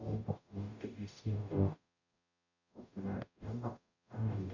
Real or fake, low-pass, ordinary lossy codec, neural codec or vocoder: fake; 7.2 kHz; none; codec, 44.1 kHz, 0.9 kbps, DAC